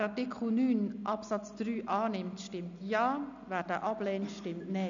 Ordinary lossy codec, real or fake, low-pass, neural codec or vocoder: none; real; 7.2 kHz; none